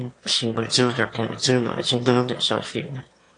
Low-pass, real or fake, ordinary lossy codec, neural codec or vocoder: 9.9 kHz; fake; AAC, 48 kbps; autoencoder, 22.05 kHz, a latent of 192 numbers a frame, VITS, trained on one speaker